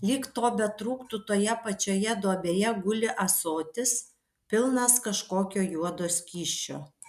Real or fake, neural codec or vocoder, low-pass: real; none; 14.4 kHz